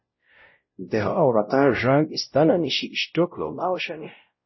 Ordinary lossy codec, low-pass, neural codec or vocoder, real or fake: MP3, 24 kbps; 7.2 kHz; codec, 16 kHz, 0.5 kbps, X-Codec, WavLM features, trained on Multilingual LibriSpeech; fake